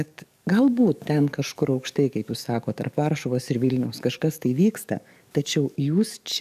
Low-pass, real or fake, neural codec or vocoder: 14.4 kHz; fake; codec, 44.1 kHz, 7.8 kbps, DAC